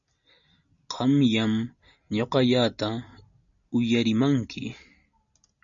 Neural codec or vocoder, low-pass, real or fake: none; 7.2 kHz; real